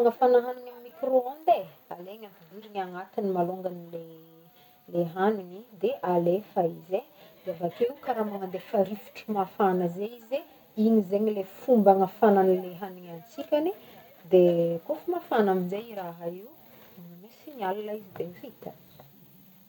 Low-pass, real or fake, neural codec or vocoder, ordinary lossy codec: 19.8 kHz; real; none; none